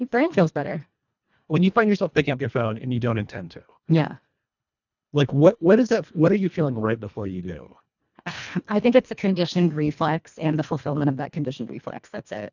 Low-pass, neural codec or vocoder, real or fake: 7.2 kHz; codec, 24 kHz, 1.5 kbps, HILCodec; fake